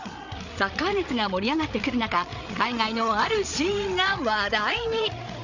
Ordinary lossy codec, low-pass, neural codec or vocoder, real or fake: none; 7.2 kHz; codec, 16 kHz, 8 kbps, FreqCodec, larger model; fake